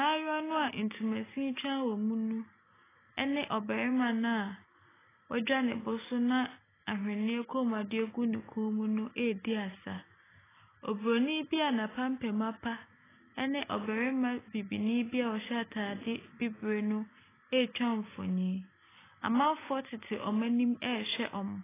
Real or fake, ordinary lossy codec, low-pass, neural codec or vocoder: real; AAC, 16 kbps; 3.6 kHz; none